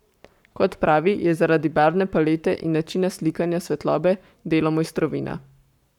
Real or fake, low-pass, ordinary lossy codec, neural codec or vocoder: fake; 19.8 kHz; none; codec, 44.1 kHz, 7.8 kbps, Pupu-Codec